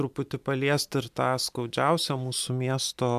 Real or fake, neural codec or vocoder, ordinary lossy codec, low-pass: real; none; MP3, 96 kbps; 14.4 kHz